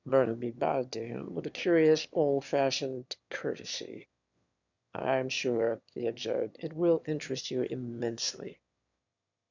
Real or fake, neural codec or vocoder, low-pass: fake; autoencoder, 22.05 kHz, a latent of 192 numbers a frame, VITS, trained on one speaker; 7.2 kHz